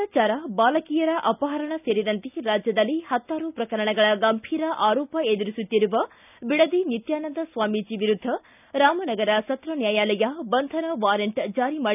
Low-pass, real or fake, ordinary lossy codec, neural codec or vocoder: 3.6 kHz; real; none; none